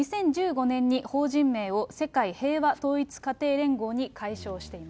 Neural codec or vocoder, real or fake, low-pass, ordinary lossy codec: none; real; none; none